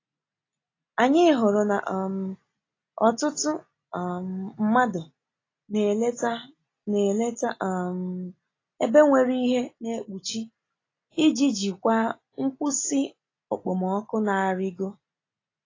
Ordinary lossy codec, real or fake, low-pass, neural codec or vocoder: AAC, 32 kbps; real; 7.2 kHz; none